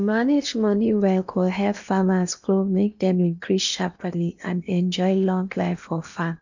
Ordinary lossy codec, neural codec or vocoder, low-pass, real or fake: none; codec, 16 kHz in and 24 kHz out, 0.8 kbps, FocalCodec, streaming, 65536 codes; 7.2 kHz; fake